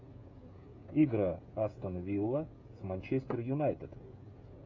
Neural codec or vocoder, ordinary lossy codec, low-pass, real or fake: codec, 16 kHz, 16 kbps, FreqCodec, smaller model; MP3, 64 kbps; 7.2 kHz; fake